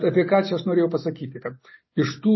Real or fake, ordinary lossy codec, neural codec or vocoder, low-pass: real; MP3, 24 kbps; none; 7.2 kHz